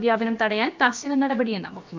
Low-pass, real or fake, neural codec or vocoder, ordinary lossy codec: 7.2 kHz; fake; codec, 16 kHz, about 1 kbps, DyCAST, with the encoder's durations; MP3, 64 kbps